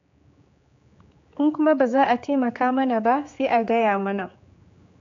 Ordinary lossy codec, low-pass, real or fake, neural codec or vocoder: AAC, 48 kbps; 7.2 kHz; fake; codec, 16 kHz, 4 kbps, X-Codec, HuBERT features, trained on general audio